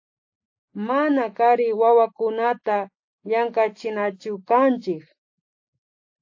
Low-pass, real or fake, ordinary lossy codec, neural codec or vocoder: 7.2 kHz; real; AAC, 48 kbps; none